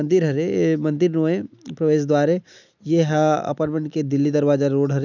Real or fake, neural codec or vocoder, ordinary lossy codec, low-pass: real; none; none; 7.2 kHz